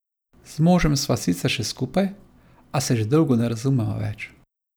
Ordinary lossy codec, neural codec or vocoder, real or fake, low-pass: none; none; real; none